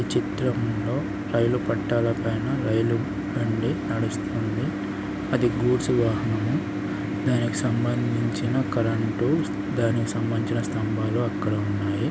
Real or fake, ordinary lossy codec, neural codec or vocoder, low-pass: real; none; none; none